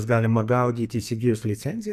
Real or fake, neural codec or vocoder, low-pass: fake; codec, 44.1 kHz, 2.6 kbps, SNAC; 14.4 kHz